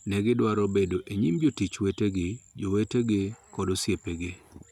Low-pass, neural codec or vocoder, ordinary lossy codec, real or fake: 19.8 kHz; none; none; real